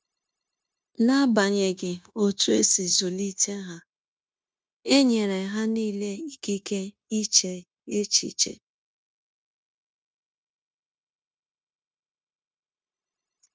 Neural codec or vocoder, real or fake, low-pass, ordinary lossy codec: codec, 16 kHz, 0.9 kbps, LongCat-Audio-Codec; fake; none; none